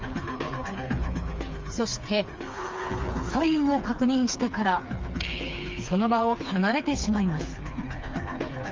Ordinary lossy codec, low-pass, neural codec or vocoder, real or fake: Opus, 32 kbps; 7.2 kHz; codec, 16 kHz, 4 kbps, FreqCodec, smaller model; fake